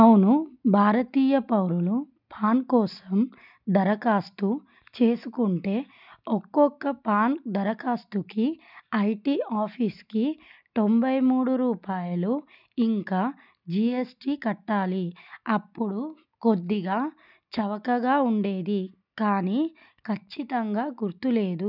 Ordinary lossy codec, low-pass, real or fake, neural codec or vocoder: none; 5.4 kHz; real; none